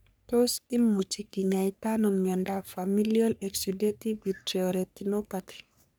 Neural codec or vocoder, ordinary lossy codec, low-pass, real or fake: codec, 44.1 kHz, 3.4 kbps, Pupu-Codec; none; none; fake